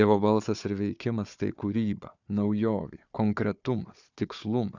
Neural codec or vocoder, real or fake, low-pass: codec, 16 kHz, 4 kbps, FunCodec, trained on Chinese and English, 50 frames a second; fake; 7.2 kHz